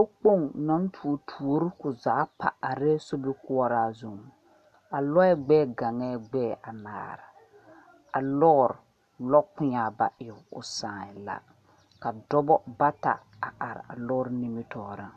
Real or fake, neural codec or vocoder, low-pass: real; none; 14.4 kHz